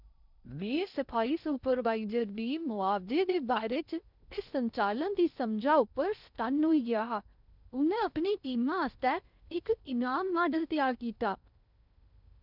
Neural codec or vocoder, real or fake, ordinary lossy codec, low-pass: codec, 16 kHz in and 24 kHz out, 0.6 kbps, FocalCodec, streaming, 2048 codes; fake; none; 5.4 kHz